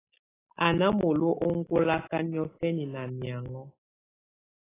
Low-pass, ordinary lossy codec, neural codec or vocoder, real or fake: 3.6 kHz; AAC, 16 kbps; none; real